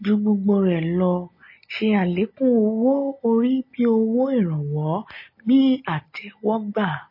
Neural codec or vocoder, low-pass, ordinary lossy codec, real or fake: none; 5.4 kHz; MP3, 24 kbps; real